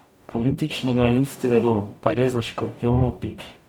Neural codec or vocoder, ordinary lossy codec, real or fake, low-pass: codec, 44.1 kHz, 0.9 kbps, DAC; none; fake; 19.8 kHz